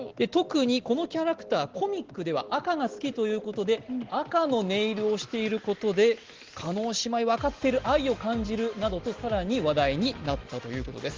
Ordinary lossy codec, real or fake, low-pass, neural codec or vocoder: Opus, 16 kbps; real; 7.2 kHz; none